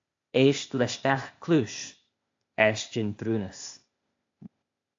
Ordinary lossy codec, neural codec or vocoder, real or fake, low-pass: AAC, 48 kbps; codec, 16 kHz, 0.8 kbps, ZipCodec; fake; 7.2 kHz